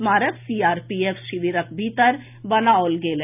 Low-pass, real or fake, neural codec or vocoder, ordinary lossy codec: 3.6 kHz; real; none; none